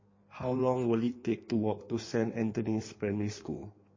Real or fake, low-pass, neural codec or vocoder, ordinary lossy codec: fake; 7.2 kHz; codec, 16 kHz in and 24 kHz out, 1.1 kbps, FireRedTTS-2 codec; MP3, 32 kbps